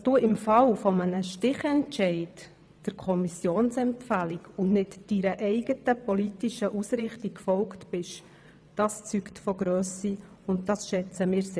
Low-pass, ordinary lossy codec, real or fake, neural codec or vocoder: none; none; fake; vocoder, 22.05 kHz, 80 mel bands, WaveNeXt